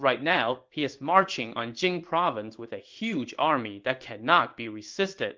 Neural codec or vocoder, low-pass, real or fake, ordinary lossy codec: codec, 16 kHz in and 24 kHz out, 1 kbps, XY-Tokenizer; 7.2 kHz; fake; Opus, 32 kbps